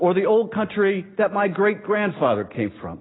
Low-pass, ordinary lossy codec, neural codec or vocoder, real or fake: 7.2 kHz; AAC, 16 kbps; none; real